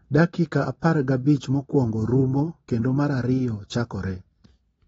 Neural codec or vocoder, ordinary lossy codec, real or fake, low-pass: none; AAC, 24 kbps; real; 19.8 kHz